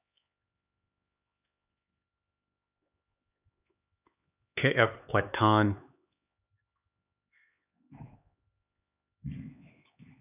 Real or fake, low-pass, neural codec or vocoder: fake; 3.6 kHz; codec, 16 kHz, 4 kbps, X-Codec, HuBERT features, trained on LibriSpeech